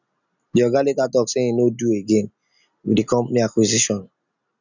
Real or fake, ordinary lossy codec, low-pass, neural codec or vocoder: real; none; 7.2 kHz; none